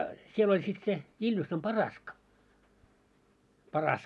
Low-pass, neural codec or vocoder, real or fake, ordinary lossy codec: none; none; real; none